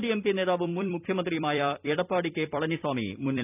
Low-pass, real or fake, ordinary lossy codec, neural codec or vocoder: 3.6 kHz; fake; none; vocoder, 44.1 kHz, 128 mel bands every 256 samples, BigVGAN v2